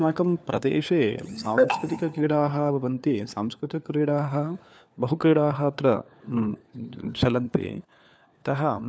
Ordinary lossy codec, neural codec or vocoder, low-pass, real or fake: none; codec, 16 kHz, 4 kbps, FunCodec, trained on LibriTTS, 50 frames a second; none; fake